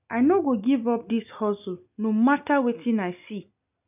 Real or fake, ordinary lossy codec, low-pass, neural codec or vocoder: real; none; 3.6 kHz; none